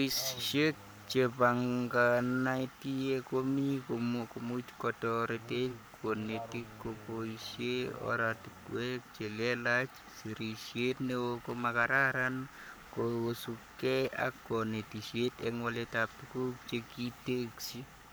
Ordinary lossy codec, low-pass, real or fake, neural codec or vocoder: none; none; fake; codec, 44.1 kHz, 7.8 kbps, Pupu-Codec